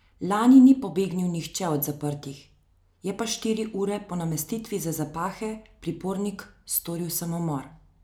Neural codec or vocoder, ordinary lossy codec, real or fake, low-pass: none; none; real; none